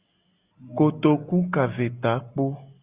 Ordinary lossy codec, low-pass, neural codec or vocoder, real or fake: Opus, 64 kbps; 3.6 kHz; none; real